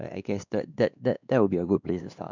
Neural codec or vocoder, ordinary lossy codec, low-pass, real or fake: codec, 16 kHz, 2 kbps, X-Codec, WavLM features, trained on Multilingual LibriSpeech; none; 7.2 kHz; fake